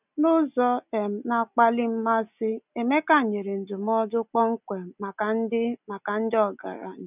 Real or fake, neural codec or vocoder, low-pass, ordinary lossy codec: real; none; 3.6 kHz; none